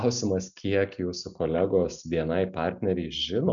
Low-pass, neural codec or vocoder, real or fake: 7.2 kHz; none; real